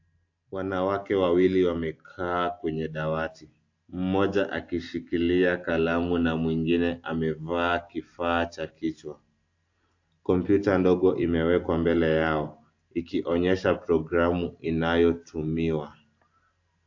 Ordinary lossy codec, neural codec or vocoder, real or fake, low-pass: AAC, 48 kbps; none; real; 7.2 kHz